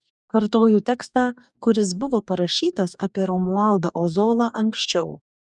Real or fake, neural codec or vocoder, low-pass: fake; codec, 44.1 kHz, 2.6 kbps, DAC; 10.8 kHz